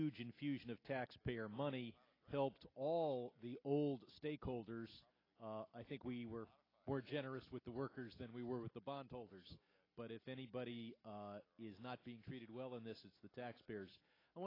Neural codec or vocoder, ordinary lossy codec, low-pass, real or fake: none; AAC, 24 kbps; 5.4 kHz; real